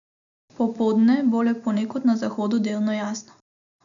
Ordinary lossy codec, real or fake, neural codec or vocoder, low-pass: none; real; none; 7.2 kHz